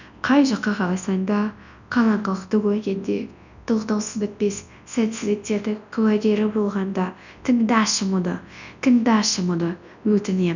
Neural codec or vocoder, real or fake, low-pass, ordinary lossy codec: codec, 24 kHz, 0.9 kbps, WavTokenizer, large speech release; fake; 7.2 kHz; none